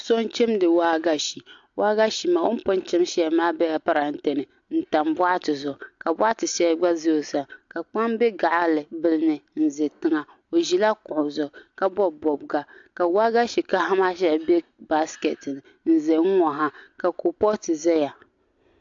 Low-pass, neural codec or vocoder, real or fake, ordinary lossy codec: 7.2 kHz; none; real; AAC, 64 kbps